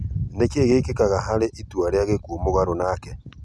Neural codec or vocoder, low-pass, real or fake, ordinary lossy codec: none; none; real; none